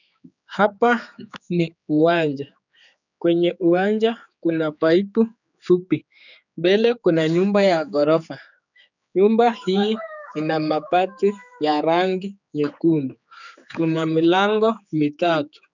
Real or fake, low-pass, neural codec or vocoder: fake; 7.2 kHz; codec, 16 kHz, 4 kbps, X-Codec, HuBERT features, trained on general audio